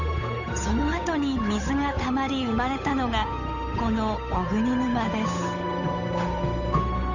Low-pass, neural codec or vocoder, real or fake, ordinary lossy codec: 7.2 kHz; codec, 16 kHz, 8 kbps, FunCodec, trained on Chinese and English, 25 frames a second; fake; none